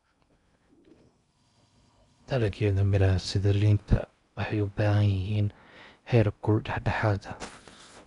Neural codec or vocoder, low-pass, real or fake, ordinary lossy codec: codec, 16 kHz in and 24 kHz out, 0.8 kbps, FocalCodec, streaming, 65536 codes; 10.8 kHz; fake; none